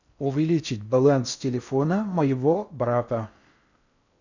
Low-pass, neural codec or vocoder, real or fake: 7.2 kHz; codec, 16 kHz in and 24 kHz out, 0.6 kbps, FocalCodec, streaming, 4096 codes; fake